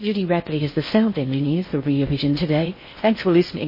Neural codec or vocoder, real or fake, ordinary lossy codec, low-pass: codec, 16 kHz in and 24 kHz out, 0.6 kbps, FocalCodec, streaming, 4096 codes; fake; MP3, 24 kbps; 5.4 kHz